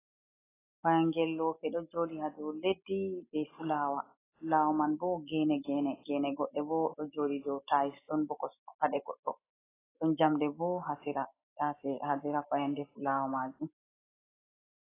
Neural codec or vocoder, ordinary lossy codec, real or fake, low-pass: none; AAC, 16 kbps; real; 3.6 kHz